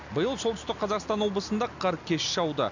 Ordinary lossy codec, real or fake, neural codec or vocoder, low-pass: none; real; none; 7.2 kHz